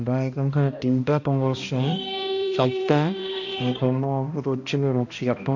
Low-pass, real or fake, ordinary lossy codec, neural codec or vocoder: 7.2 kHz; fake; MP3, 48 kbps; codec, 16 kHz, 1 kbps, X-Codec, HuBERT features, trained on balanced general audio